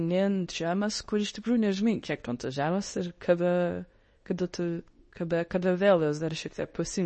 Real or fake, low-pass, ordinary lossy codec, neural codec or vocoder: fake; 10.8 kHz; MP3, 32 kbps; codec, 24 kHz, 0.9 kbps, WavTokenizer, medium speech release version 2